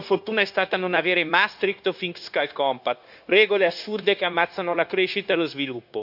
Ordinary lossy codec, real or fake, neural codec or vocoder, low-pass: none; fake; codec, 16 kHz, 0.9 kbps, LongCat-Audio-Codec; 5.4 kHz